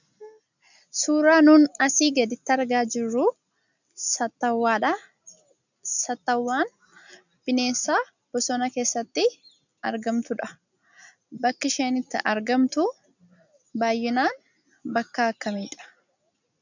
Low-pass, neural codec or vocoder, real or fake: 7.2 kHz; none; real